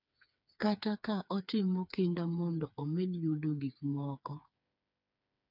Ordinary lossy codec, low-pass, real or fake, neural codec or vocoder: none; 5.4 kHz; fake; codec, 16 kHz, 4 kbps, FreqCodec, smaller model